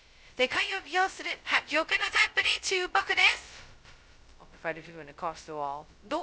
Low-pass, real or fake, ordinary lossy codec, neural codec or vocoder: none; fake; none; codec, 16 kHz, 0.2 kbps, FocalCodec